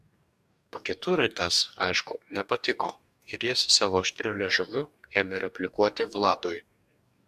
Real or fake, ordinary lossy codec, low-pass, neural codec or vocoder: fake; AAC, 96 kbps; 14.4 kHz; codec, 44.1 kHz, 2.6 kbps, DAC